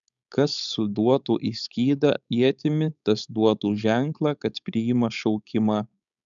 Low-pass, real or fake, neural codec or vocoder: 7.2 kHz; fake; codec, 16 kHz, 4.8 kbps, FACodec